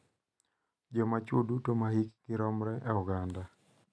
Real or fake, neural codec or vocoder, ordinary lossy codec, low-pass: real; none; none; none